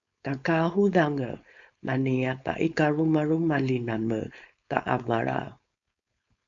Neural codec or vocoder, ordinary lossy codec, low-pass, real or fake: codec, 16 kHz, 4.8 kbps, FACodec; AAC, 64 kbps; 7.2 kHz; fake